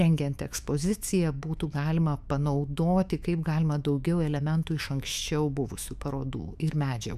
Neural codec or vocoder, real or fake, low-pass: autoencoder, 48 kHz, 128 numbers a frame, DAC-VAE, trained on Japanese speech; fake; 14.4 kHz